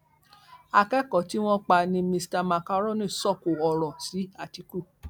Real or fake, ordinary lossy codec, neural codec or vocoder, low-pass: real; none; none; 19.8 kHz